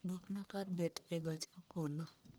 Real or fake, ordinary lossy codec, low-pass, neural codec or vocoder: fake; none; none; codec, 44.1 kHz, 1.7 kbps, Pupu-Codec